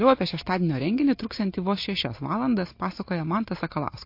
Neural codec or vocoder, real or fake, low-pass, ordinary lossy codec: none; real; 5.4 kHz; MP3, 32 kbps